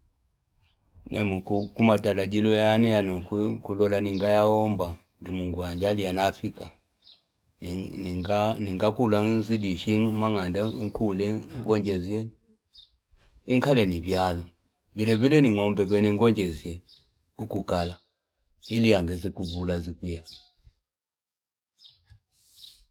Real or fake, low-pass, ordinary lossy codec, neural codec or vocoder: fake; 19.8 kHz; none; codec, 44.1 kHz, 7.8 kbps, DAC